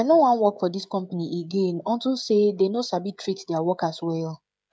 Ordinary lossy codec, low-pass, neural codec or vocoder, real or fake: none; none; codec, 16 kHz, 16 kbps, FreqCodec, smaller model; fake